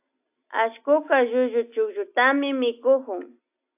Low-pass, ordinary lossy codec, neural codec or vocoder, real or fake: 3.6 kHz; AAC, 32 kbps; none; real